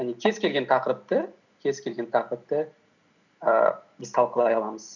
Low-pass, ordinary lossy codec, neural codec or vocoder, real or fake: 7.2 kHz; none; none; real